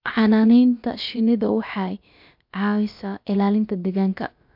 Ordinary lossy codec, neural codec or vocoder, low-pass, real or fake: MP3, 48 kbps; codec, 16 kHz, about 1 kbps, DyCAST, with the encoder's durations; 5.4 kHz; fake